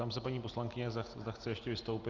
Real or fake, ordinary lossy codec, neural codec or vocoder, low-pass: real; Opus, 32 kbps; none; 7.2 kHz